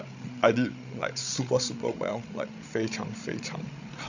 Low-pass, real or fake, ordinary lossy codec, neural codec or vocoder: 7.2 kHz; fake; none; codec, 16 kHz, 16 kbps, FreqCodec, larger model